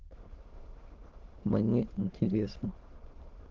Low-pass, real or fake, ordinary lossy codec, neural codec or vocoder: 7.2 kHz; fake; Opus, 16 kbps; autoencoder, 22.05 kHz, a latent of 192 numbers a frame, VITS, trained on many speakers